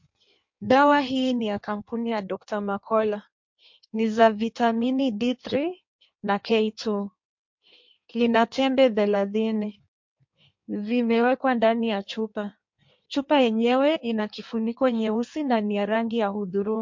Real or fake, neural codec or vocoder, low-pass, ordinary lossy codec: fake; codec, 16 kHz in and 24 kHz out, 1.1 kbps, FireRedTTS-2 codec; 7.2 kHz; MP3, 48 kbps